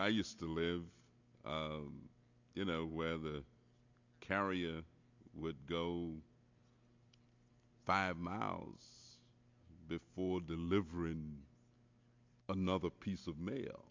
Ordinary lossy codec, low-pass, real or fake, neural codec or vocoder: MP3, 64 kbps; 7.2 kHz; real; none